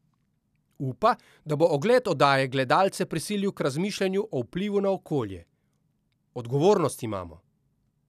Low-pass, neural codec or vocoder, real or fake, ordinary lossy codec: 14.4 kHz; none; real; none